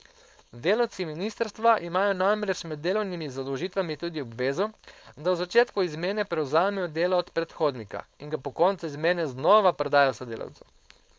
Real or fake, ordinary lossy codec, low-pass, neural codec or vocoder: fake; none; none; codec, 16 kHz, 4.8 kbps, FACodec